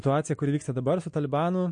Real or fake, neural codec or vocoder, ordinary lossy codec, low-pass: real; none; MP3, 48 kbps; 9.9 kHz